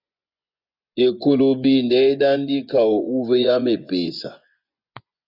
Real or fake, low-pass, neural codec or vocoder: fake; 5.4 kHz; vocoder, 24 kHz, 100 mel bands, Vocos